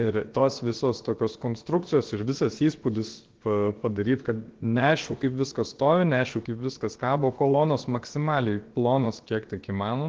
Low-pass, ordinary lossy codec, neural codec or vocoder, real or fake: 7.2 kHz; Opus, 16 kbps; codec, 16 kHz, about 1 kbps, DyCAST, with the encoder's durations; fake